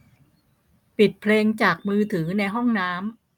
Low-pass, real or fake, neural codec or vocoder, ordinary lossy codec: 19.8 kHz; real; none; none